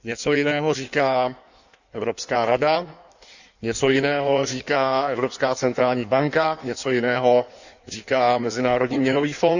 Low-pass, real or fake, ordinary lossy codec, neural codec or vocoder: 7.2 kHz; fake; none; codec, 16 kHz in and 24 kHz out, 1.1 kbps, FireRedTTS-2 codec